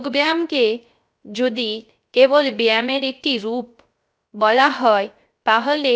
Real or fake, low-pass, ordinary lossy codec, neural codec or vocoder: fake; none; none; codec, 16 kHz, 0.3 kbps, FocalCodec